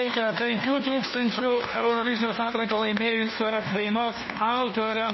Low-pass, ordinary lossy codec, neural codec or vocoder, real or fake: 7.2 kHz; MP3, 24 kbps; codec, 16 kHz, 1 kbps, FunCodec, trained on LibriTTS, 50 frames a second; fake